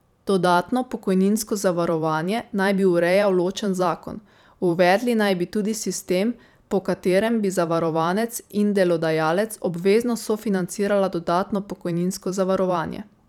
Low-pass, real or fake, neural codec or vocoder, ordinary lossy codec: 19.8 kHz; fake; vocoder, 44.1 kHz, 128 mel bands every 512 samples, BigVGAN v2; none